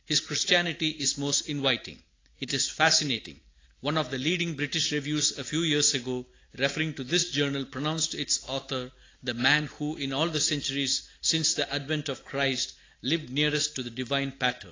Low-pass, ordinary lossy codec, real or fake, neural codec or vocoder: 7.2 kHz; AAC, 32 kbps; real; none